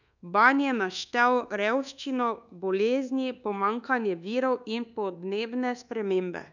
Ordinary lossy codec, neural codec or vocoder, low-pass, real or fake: none; codec, 24 kHz, 1.2 kbps, DualCodec; 7.2 kHz; fake